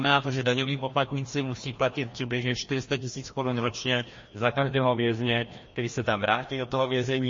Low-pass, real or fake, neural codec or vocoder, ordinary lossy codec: 7.2 kHz; fake; codec, 16 kHz, 1 kbps, FreqCodec, larger model; MP3, 32 kbps